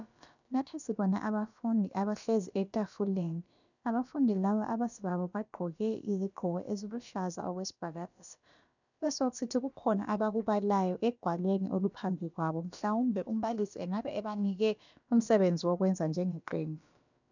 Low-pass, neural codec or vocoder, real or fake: 7.2 kHz; codec, 16 kHz, about 1 kbps, DyCAST, with the encoder's durations; fake